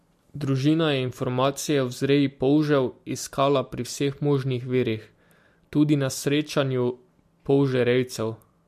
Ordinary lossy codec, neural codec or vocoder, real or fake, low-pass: MP3, 64 kbps; none; real; 14.4 kHz